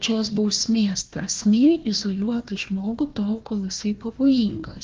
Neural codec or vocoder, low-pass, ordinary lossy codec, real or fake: codec, 16 kHz, 1 kbps, FunCodec, trained on Chinese and English, 50 frames a second; 7.2 kHz; Opus, 24 kbps; fake